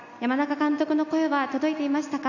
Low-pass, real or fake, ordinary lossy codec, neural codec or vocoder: 7.2 kHz; real; none; none